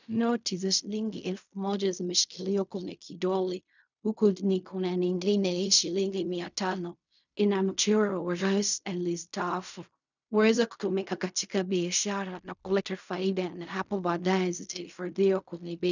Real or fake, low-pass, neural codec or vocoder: fake; 7.2 kHz; codec, 16 kHz in and 24 kHz out, 0.4 kbps, LongCat-Audio-Codec, fine tuned four codebook decoder